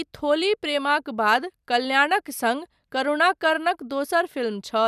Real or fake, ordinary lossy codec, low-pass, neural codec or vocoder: real; none; 14.4 kHz; none